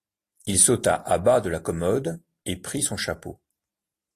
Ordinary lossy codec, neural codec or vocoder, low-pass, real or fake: AAC, 48 kbps; none; 14.4 kHz; real